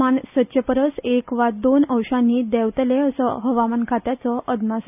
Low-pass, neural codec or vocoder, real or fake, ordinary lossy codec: 3.6 kHz; none; real; none